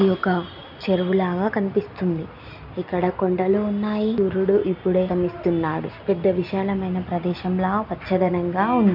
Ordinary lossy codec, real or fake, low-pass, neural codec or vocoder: none; real; 5.4 kHz; none